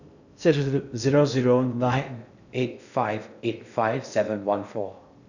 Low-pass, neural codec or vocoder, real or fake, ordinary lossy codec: 7.2 kHz; codec, 16 kHz in and 24 kHz out, 0.6 kbps, FocalCodec, streaming, 2048 codes; fake; none